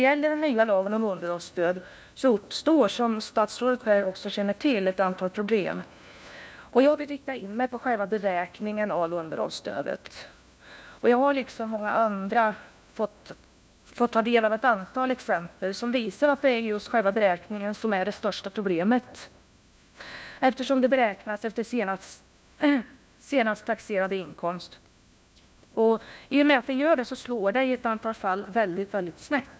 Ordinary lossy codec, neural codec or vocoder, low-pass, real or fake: none; codec, 16 kHz, 1 kbps, FunCodec, trained on LibriTTS, 50 frames a second; none; fake